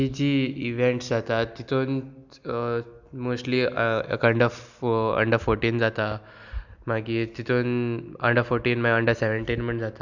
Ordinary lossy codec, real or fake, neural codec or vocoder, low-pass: none; real; none; 7.2 kHz